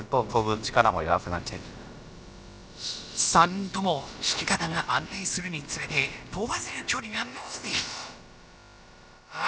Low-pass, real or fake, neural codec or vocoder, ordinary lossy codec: none; fake; codec, 16 kHz, about 1 kbps, DyCAST, with the encoder's durations; none